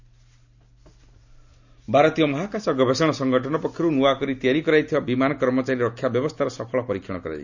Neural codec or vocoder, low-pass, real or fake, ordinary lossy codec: none; 7.2 kHz; real; none